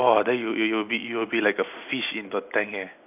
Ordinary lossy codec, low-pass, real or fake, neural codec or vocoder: AAC, 32 kbps; 3.6 kHz; real; none